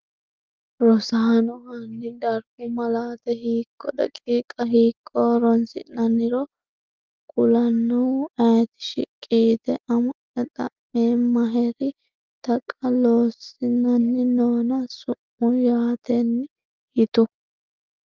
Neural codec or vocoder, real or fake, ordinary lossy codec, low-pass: none; real; Opus, 32 kbps; 7.2 kHz